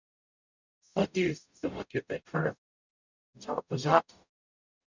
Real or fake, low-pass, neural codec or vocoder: fake; 7.2 kHz; codec, 44.1 kHz, 0.9 kbps, DAC